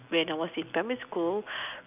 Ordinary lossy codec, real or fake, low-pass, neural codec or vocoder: none; real; 3.6 kHz; none